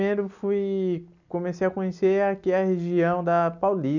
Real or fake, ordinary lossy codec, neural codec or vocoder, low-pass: real; none; none; 7.2 kHz